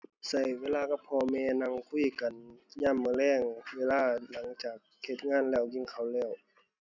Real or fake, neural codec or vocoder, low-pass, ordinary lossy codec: real; none; 7.2 kHz; none